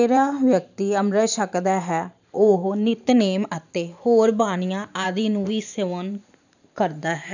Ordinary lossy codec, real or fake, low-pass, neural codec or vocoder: none; fake; 7.2 kHz; vocoder, 44.1 kHz, 128 mel bands every 512 samples, BigVGAN v2